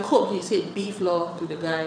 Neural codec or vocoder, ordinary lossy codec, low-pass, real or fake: vocoder, 22.05 kHz, 80 mel bands, WaveNeXt; none; 9.9 kHz; fake